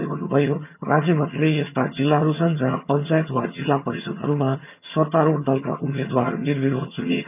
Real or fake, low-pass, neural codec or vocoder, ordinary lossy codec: fake; 3.6 kHz; vocoder, 22.05 kHz, 80 mel bands, HiFi-GAN; none